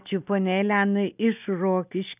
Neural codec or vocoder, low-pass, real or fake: none; 3.6 kHz; real